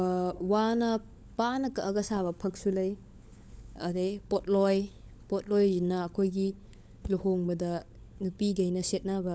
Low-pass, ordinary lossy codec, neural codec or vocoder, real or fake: none; none; codec, 16 kHz, 16 kbps, FunCodec, trained on LibriTTS, 50 frames a second; fake